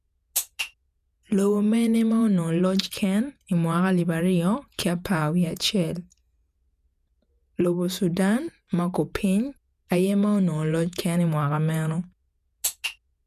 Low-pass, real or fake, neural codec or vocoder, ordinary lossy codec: 14.4 kHz; fake; vocoder, 48 kHz, 128 mel bands, Vocos; none